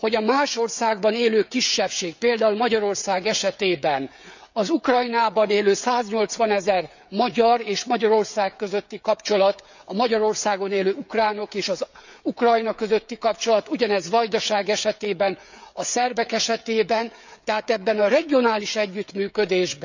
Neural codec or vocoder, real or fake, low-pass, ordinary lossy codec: codec, 16 kHz, 16 kbps, FreqCodec, smaller model; fake; 7.2 kHz; none